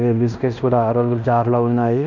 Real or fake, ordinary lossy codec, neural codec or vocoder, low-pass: fake; none; codec, 16 kHz in and 24 kHz out, 0.9 kbps, LongCat-Audio-Codec, fine tuned four codebook decoder; 7.2 kHz